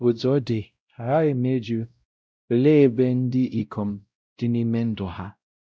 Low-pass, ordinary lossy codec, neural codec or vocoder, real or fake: none; none; codec, 16 kHz, 0.5 kbps, X-Codec, WavLM features, trained on Multilingual LibriSpeech; fake